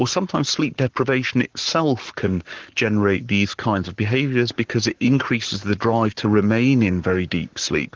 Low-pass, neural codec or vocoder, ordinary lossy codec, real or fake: 7.2 kHz; codec, 44.1 kHz, 7.8 kbps, Pupu-Codec; Opus, 16 kbps; fake